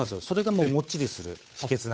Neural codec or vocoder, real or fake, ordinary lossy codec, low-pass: codec, 16 kHz, 8 kbps, FunCodec, trained on Chinese and English, 25 frames a second; fake; none; none